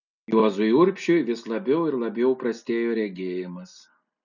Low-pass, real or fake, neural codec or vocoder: 7.2 kHz; real; none